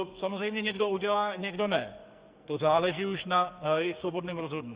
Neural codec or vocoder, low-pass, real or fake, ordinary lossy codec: codec, 44.1 kHz, 2.6 kbps, SNAC; 3.6 kHz; fake; Opus, 64 kbps